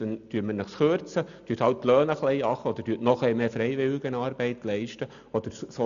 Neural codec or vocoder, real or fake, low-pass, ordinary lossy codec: none; real; 7.2 kHz; none